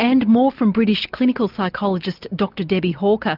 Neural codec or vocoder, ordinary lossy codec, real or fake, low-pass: none; Opus, 24 kbps; real; 5.4 kHz